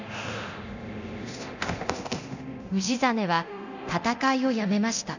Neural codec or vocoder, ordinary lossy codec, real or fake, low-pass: codec, 24 kHz, 0.9 kbps, DualCodec; none; fake; 7.2 kHz